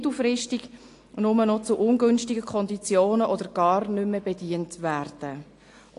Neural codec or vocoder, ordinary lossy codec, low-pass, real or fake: none; AAC, 48 kbps; 10.8 kHz; real